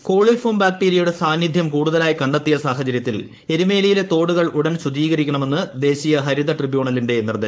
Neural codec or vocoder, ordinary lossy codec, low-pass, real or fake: codec, 16 kHz, 4.8 kbps, FACodec; none; none; fake